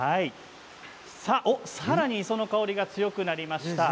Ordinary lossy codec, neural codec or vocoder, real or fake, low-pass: none; none; real; none